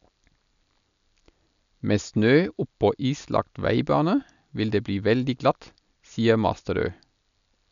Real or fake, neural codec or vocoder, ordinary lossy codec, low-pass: real; none; none; 7.2 kHz